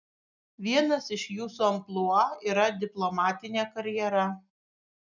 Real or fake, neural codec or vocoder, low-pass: real; none; 7.2 kHz